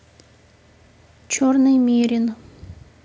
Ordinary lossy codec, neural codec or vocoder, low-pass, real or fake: none; none; none; real